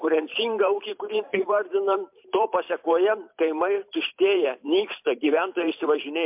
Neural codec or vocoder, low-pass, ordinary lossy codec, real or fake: vocoder, 44.1 kHz, 128 mel bands every 512 samples, BigVGAN v2; 3.6 kHz; MP3, 32 kbps; fake